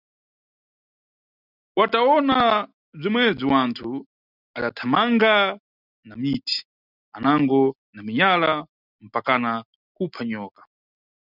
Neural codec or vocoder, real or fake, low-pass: none; real; 5.4 kHz